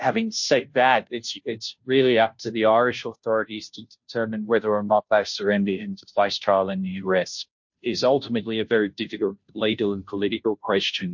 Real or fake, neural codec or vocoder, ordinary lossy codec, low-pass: fake; codec, 16 kHz, 0.5 kbps, FunCodec, trained on Chinese and English, 25 frames a second; MP3, 48 kbps; 7.2 kHz